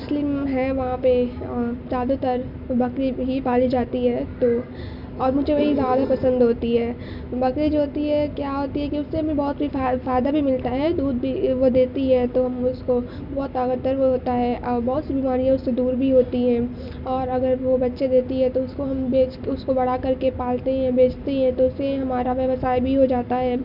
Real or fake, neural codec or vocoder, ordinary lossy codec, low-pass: real; none; none; 5.4 kHz